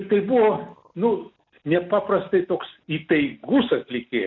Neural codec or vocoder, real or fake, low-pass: none; real; 7.2 kHz